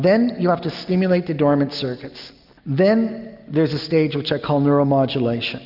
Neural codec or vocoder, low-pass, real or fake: none; 5.4 kHz; real